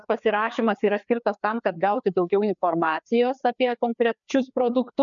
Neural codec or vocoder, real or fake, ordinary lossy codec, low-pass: codec, 16 kHz, 2 kbps, FreqCodec, larger model; fake; MP3, 96 kbps; 7.2 kHz